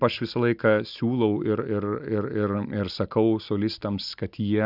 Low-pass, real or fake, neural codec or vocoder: 5.4 kHz; real; none